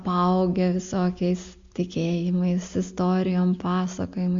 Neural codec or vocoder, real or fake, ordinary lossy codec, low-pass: none; real; MP3, 48 kbps; 7.2 kHz